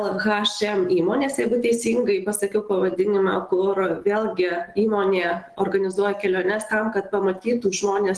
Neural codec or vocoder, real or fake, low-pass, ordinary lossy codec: none; real; 10.8 kHz; Opus, 16 kbps